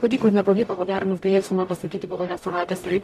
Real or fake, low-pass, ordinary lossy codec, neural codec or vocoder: fake; 14.4 kHz; AAC, 96 kbps; codec, 44.1 kHz, 0.9 kbps, DAC